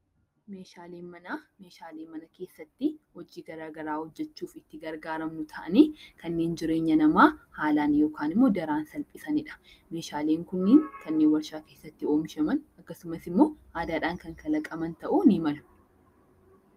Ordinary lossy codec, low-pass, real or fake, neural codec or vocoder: Opus, 32 kbps; 14.4 kHz; real; none